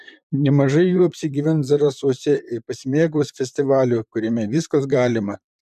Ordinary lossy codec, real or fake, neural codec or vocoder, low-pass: MP3, 96 kbps; fake; vocoder, 44.1 kHz, 128 mel bands, Pupu-Vocoder; 14.4 kHz